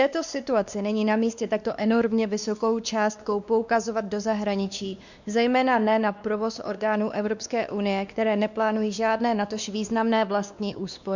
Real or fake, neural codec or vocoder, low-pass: fake; codec, 16 kHz, 2 kbps, X-Codec, WavLM features, trained on Multilingual LibriSpeech; 7.2 kHz